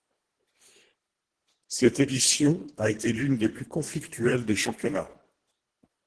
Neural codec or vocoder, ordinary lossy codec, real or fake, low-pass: codec, 24 kHz, 1.5 kbps, HILCodec; Opus, 16 kbps; fake; 10.8 kHz